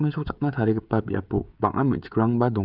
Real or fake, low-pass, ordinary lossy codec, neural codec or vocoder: fake; 5.4 kHz; none; codec, 16 kHz, 16 kbps, FreqCodec, smaller model